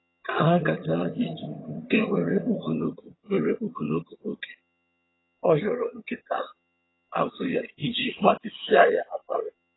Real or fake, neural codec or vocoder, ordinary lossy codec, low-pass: fake; vocoder, 22.05 kHz, 80 mel bands, HiFi-GAN; AAC, 16 kbps; 7.2 kHz